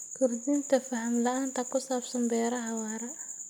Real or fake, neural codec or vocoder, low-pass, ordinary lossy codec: real; none; none; none